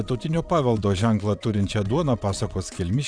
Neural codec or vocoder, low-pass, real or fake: vocoder, 22.05 kHz, 80 mel bands, WaveNeXt; 9.9 kHz; fake